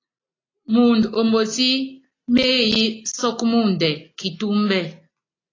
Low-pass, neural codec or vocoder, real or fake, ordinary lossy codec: 7.2 kHz; none; real; AAC, 32 kbps